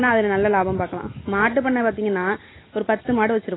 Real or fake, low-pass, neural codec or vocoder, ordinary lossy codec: real; 7.2 kHz; none; AAC, 16 kbps